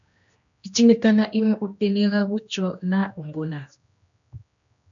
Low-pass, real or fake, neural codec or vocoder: 7.2 kHz; fake; codec, 16 kHz, 1 kbps, X-Codec, HuBERT features, trained on general audio